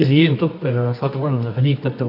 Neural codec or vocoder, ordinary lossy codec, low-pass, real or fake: codec, 24 kHz, 0.9 kbps, WavTokenizer, medium music audio release; none; 5.4 kHz; fake